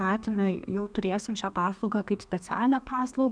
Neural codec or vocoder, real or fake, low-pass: codec, 32 kHz, 1.9 kbps, SNAC; fake; 9.9 kHz